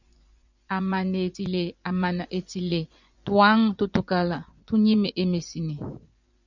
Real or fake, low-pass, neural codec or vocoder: fake; 7.2 kHz; vocoder, 24 kHz, 100 mel bands, Vocos